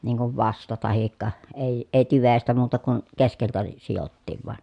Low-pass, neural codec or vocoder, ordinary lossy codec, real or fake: 10.8 kHz; none; MP3, 96 kbps; real